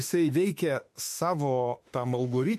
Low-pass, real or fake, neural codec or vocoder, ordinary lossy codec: 14.4 kHz; fake; autoencoder, 48 kHz, 32 numbers a frame, DAC-VAE, trained on Japanese speech; MP3, 64 kbps